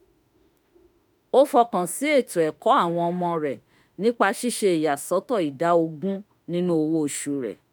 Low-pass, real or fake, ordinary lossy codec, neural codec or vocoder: none; fake; none; autoencoder, 48 kHz, 32 numbers a frame, DAC-VAE, trained on Japanese speech